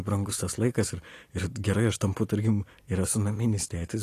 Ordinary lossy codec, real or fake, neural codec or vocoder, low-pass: AAC, 48 kbps; real; none; 14.4 kHz